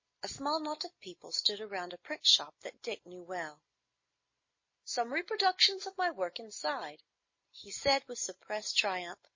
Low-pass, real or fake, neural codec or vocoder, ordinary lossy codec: 7.2 kHz; real; none; MP3, 32 kbps